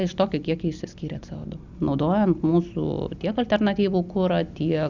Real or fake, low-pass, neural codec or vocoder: real; 7.2 kHz; none